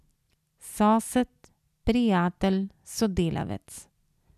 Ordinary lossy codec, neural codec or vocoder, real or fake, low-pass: none; none; real; 14.4 kHz